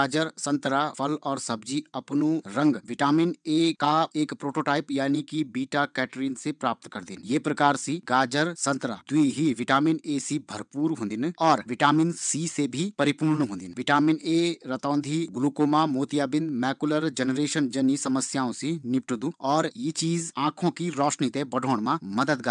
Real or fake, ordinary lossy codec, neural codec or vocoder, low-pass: fake; none; vocoder, 22.05 kHz, 80 mel bands, WaveNeXt; 9.9 kHz